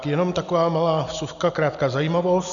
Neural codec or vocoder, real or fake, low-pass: none; real; 7.2 kHz